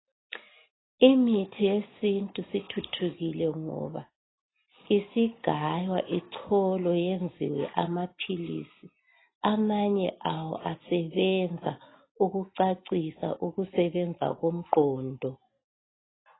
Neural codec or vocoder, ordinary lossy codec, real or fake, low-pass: none; AAC, 16 kbps; real; 7.2 kHz